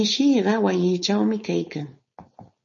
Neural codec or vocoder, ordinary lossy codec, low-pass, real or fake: codec, 16 kHz, 4.8 kbps, FACodec; MP3, 32 kbps; 7.2 kHz; fake